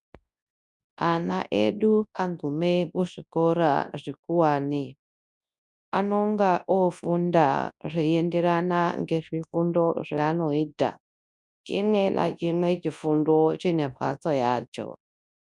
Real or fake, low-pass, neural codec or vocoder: fake; 10.8 kHz; codec, 24 kHz, 0.9 kbps, WavTokenizer, large speech release